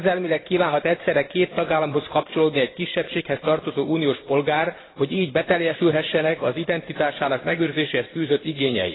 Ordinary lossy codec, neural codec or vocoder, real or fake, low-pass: AAC, 16 kbps; vocoder, 44.1 kHz, 128 mel bands every 512 samples, BigVGAN v2; fake; 7.2 kHz